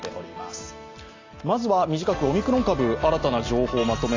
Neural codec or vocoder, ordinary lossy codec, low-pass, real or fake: none; AAC, 32 kbps; 7.2 kHz; real